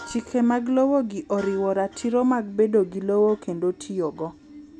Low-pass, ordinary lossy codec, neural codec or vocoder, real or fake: none; none; none; real